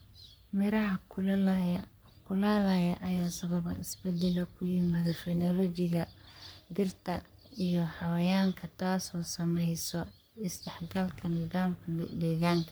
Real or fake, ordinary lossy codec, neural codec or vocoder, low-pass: fake; none; codec, 44.1 kHz, 3.4 kbps, Pupu-Codec; none